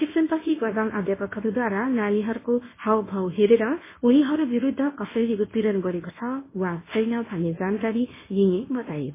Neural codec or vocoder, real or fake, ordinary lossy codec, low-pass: codec, 24 kHz, 0.9 kbps, WavTokenizer, medium speech release version 1; fake; MP3, 16 kbps; 3.6 kHz